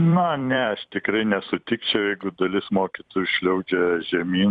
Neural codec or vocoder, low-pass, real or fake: vocoder, 44.1 kHz, 128 mel bands every 256 samples, BigVGAN v2; 10.8 kHz; fake